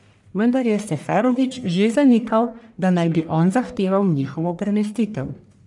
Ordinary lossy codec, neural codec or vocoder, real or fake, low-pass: none; codec, 44.1 kHz, 1.7 kbps, Pupu-Codec; fake; 10.8 kHz